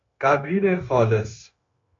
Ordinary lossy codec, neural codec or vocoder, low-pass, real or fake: AAC, 48 kbps; codec, 16 kHz, 0.9 kbps, LongCat-Audio-Codec; 7.2 kHz; fake